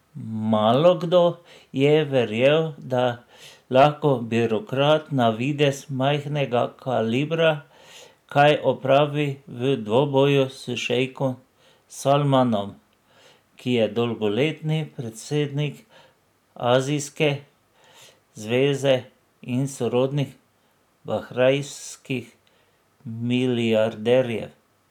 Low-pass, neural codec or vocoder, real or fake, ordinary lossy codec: 19.8 kHz; none; real; none